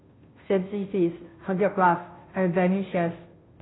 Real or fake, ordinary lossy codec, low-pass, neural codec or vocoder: fake; AAC, 16 kbps; 7.2 kHz; codec, 16 kHz, 0.5 kbps, FunCodec, trained on Chinese and English, 25 frames a second